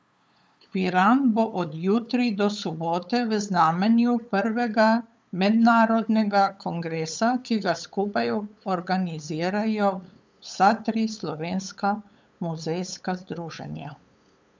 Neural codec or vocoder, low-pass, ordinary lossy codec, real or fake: codec, 16 kHz, 8 kbps, FunCodec, trained on LibriTTS, 25 frames a second; none; none; fake